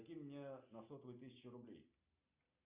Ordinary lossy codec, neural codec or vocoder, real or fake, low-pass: Opus, 64 kbps; none; real; 3.6 kHz